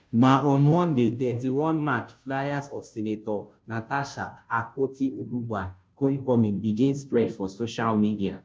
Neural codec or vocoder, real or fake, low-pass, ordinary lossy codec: codec, 16 kHz, 0.5 kbps, FunCodec, trained on Chinese and English, 25 frames a second; fake; none; none